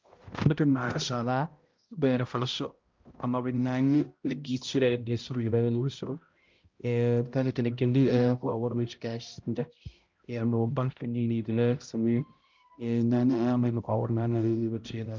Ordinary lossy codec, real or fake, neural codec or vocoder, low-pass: Opus, 32 kbps; fake; codec, 16 kHz, 0.5 kbps, X-Codec, HuBERT features, trained on balanced general audio; 7.2 kHz